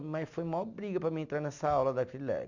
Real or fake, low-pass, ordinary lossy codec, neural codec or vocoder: real; 7.2 kHz; none; none